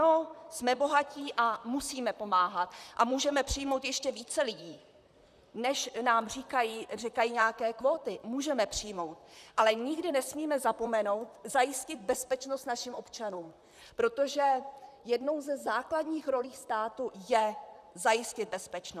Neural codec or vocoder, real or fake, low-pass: vocoder, 44.1 kHz, 128 mel bands, Pupu-Vocoder; fake; 14.4 kHz